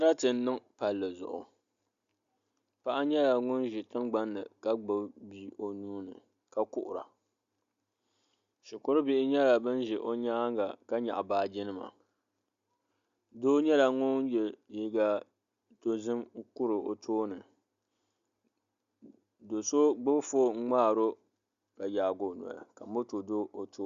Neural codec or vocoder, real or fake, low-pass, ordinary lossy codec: none; real; 7.2 kHz; Opus, 64 kbps